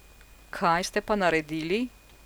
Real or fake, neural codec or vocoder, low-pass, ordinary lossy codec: real; none; none; none